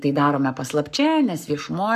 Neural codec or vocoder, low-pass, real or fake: codec, 44.1 kHz, 7.8 kbps, Pupu-Codec; 14.4 kHz; fake